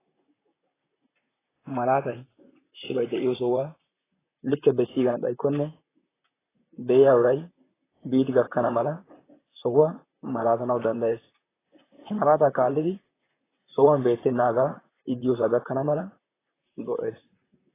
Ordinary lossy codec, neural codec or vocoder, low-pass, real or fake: AAC, 16 kbps; vocoder, 44.1 kHz, 128 mel bands, Pupu-Vocoder; 3.6 kHz; fake